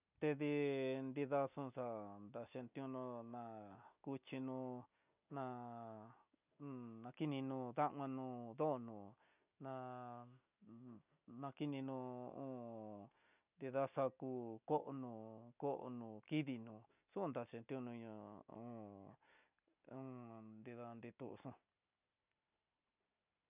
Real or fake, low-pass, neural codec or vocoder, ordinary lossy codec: real; 3.6 kHz; none; none